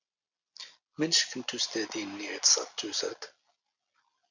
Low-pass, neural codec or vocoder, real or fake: 7.2 kHz; none; real